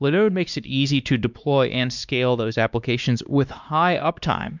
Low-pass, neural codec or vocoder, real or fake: 7.2 kHz; codec, 16 kHz, 2 kbps, X-Codec, WavLM features, trained on Multilingual LibriSpeech; fake